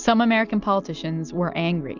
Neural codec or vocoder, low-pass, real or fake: none; 7.2 kHz; real